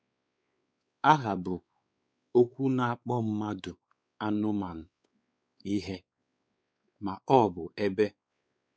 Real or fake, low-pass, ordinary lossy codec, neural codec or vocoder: fake; none; none; codec, 16 kHz, 4 kbps, X-Codec, WavLM features, trained on Multilingual LibriSpeech